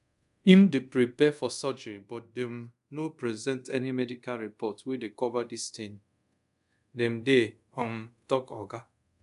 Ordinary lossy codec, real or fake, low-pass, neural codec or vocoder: MP3, 96 kbps; fake; 10.8 kHz; codec, 24 kHz, 0.5 kbps, DualCodec